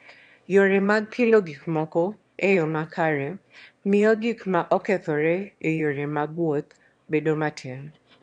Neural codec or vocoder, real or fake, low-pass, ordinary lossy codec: autoencoder, 22.05 kHz, a latent of 192 numbers a frame, VITS, trained on one speaker; fake; 9.9 kHz; MP3, 64 kbps